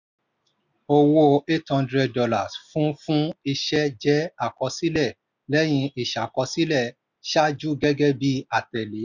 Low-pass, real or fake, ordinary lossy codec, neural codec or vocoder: 7.2 kHz; real; none; none